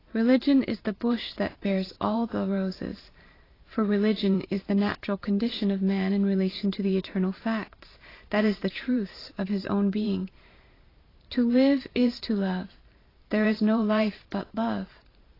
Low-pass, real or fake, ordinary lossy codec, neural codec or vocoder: 5.4 kHz; fake; AAC, 24 kbps; vocoder, 44.1 kHz, 128 mel bands every 512 samples, BigVGAN v2